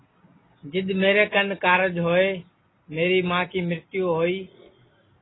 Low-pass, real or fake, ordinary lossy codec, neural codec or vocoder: 7.2 kHz; real; AAC, 16 kbps; none